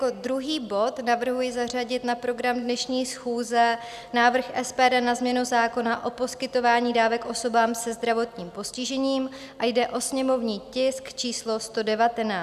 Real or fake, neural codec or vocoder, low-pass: real; none; 14.4 kHz